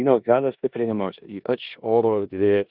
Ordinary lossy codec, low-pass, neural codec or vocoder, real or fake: Opus, 64 kbps; 5.4 kHz; codec, 16 kHz in and 24 kHz out, 0.9 kbps, LongCat-Audio-Codec, four codebook decoder; fake